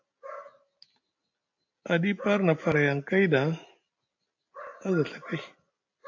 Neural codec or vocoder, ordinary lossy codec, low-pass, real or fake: none; AAC, 48 kbps; 7.2 kHz; real